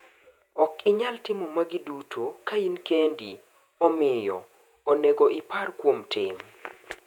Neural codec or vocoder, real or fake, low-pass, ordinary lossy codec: vocoder, 48 kHz, 128 mel bands, Vocos; fake; 19.8 kHz; none